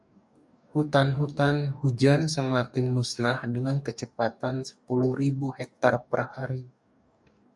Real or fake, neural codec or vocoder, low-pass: fake; codec, 44.1 kHz, 2.6 kbps, DAC; 10.8 kHz